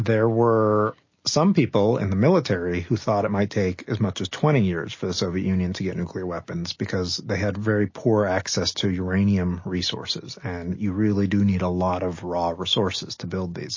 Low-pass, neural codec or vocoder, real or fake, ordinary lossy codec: 7.2 kHz; none; real; MP3, 32 kbps